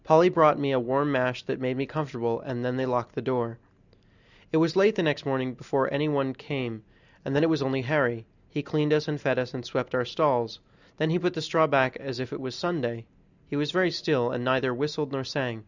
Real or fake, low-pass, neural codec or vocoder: real; 7.2 kHz; none